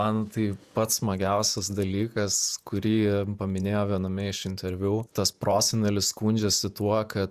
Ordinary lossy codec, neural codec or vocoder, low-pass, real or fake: Opus, 64 kbps; vocoder, 48 kHz, 128 mel bands, Vocos; 14.4 kHz; fake